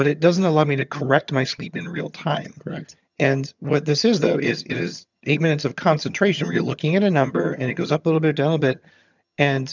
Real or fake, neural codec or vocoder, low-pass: fake; vocoder, 22.05 kHz, 80 mel bands, HiFi-GAN; 7.2 kHz